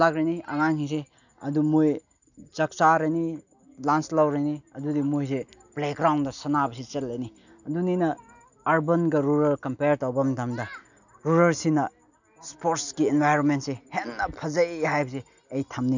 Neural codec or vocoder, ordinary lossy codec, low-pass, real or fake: none; none; 7.2 kHz; real